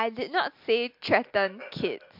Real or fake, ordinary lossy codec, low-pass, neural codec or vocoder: real; MP3, 48 kbps; 5.4 kHz; none